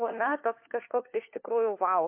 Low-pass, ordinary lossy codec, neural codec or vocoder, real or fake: 3.6 kHz; MP3, 32 kbps; codec, 16 kHz, 4 kbps, FunCodec, trained on LibriTTS, 50 frames a second; fake